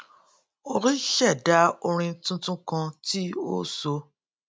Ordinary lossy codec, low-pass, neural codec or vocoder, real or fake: none; none; none; real